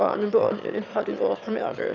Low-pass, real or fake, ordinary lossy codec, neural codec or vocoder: 7.2 kHz; fake; none; autoencoder, 22.05 kHz, a latent of 192 numbers a frame, VITS, trained on one speaker